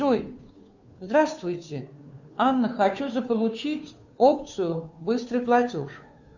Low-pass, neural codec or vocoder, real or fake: 7.2 kHz; codec, 16 kHz, 2 kbps, FunCodec, trained on Chinese and English, 25 frames a second; fake